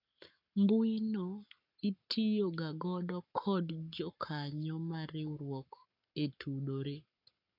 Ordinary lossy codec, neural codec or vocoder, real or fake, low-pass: none; codec, 44.1 kHz, 7.8 kbps, Pupu-Codec; fake; 5.4 kHz